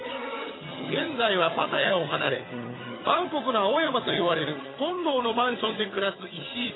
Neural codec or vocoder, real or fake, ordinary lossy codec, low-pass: vocoder, 22.05 kHz, 80 mel bands, HiFi-GAN; fake; AAC, 16 kbps; 7.2 kHz